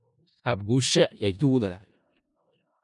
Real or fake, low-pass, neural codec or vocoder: fake; 10.8 kHz; codec, 16 kHz in and 24 kHz out, 0.4 kbps, LongCat-Audio-Codec, four codebook decoder